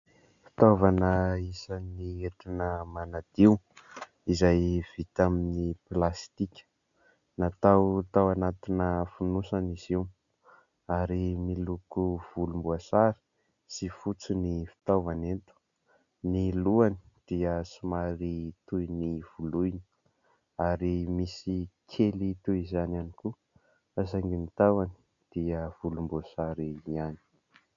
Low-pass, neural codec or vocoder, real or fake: 7.2 kHz; none; real